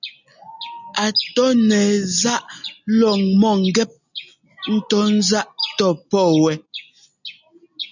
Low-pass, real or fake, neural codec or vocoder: 7.2 kHz; real; none